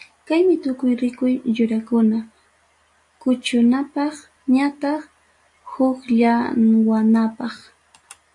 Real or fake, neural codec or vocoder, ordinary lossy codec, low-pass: real; none; AAC, 64 kbps; 10.8 kHz